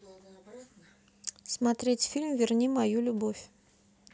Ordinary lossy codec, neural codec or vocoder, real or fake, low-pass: none; none; real; none